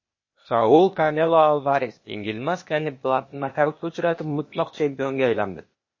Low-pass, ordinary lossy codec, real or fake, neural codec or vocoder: 7.2 kHz; MP3, 32 kbps; fake; codec, 16 kHz, 0.8 kbps, ZipCodec